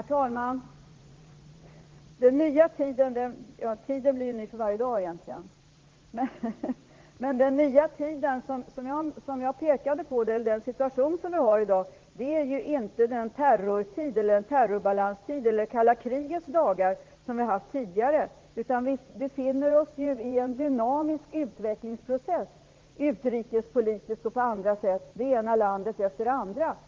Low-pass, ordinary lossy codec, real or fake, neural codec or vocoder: 7.2 kHz; Opus, 16 kbps; fake; vocoder, 44.1 kHz, 80 mel bands, Vocos